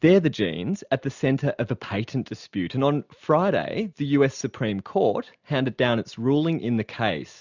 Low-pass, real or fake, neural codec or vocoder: 7.2 kHz; real; none